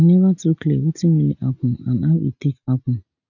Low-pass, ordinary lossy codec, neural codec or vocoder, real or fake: 7.2 kHz; none; none; real